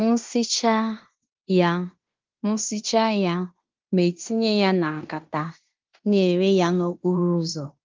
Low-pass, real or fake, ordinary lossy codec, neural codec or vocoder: 7.2 kHz; fake; Opus, 32 kbps; codec, 16 kHz in and 24 kHz out, 0.9 kbps, LongCat-Audio-Codec, fine tuned four codebook decoder